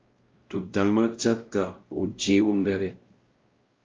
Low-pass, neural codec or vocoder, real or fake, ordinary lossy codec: 7.2 kHz; codec, 16 kHz, 0.5 kbps, X-Codec, WavLM features, trained on Multilingual LibriSpeech; fake; Opus, 24 kbps